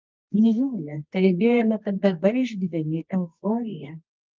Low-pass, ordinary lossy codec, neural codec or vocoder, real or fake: 7.2 kHz; Opus, 32 kbps; codec, 24 kHz, 0.9 kbps, WavTokenizer, medium music audio release; fake